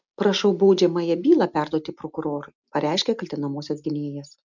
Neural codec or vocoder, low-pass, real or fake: none; 7.2 kHz; real